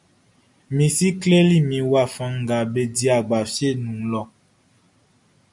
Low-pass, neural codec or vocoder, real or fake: 10.8 kHz; none; real